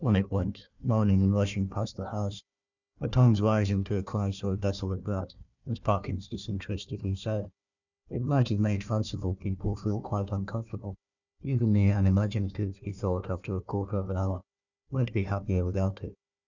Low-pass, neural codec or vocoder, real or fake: 7.2 kHz; codec, 16 kHz, 1 kbps, FunCodec, trained on Chinese and English, 50 frames a second; fake